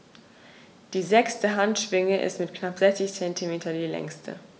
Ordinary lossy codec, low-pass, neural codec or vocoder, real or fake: none; none; none; real